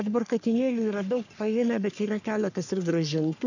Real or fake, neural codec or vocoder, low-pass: fake; codec, 44.1 kHz, 3.4 kbps, Pupu-Codec; 7.2 kHz